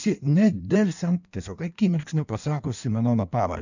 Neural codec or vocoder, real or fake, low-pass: codec, 16 kHz in and 24 kHz out, 1.1 kbps, FireRedTTS-2 codec; fake; 7.2 kHz